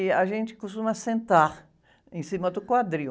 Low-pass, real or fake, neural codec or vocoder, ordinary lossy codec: none; real; none; none